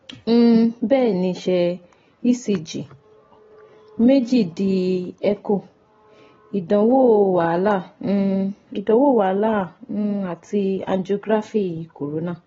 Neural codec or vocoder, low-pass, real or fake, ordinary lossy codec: none; 7.2 kHz; real; AAC, 24 kbps